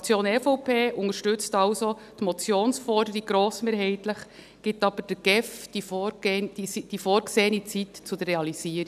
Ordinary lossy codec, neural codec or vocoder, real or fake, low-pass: none; none; real; 14.4 kHz